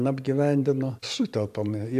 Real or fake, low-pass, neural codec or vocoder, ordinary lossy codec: fake; 14.4 kHz; vocoder, 44.1 kHz, 128 mel bands every 512 samples, BigVGAN v2; AAC, 96 kbps